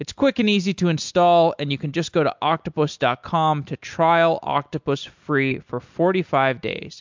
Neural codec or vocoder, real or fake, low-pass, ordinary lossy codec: none; real; 7.2 kHz; MP3, 64 kbps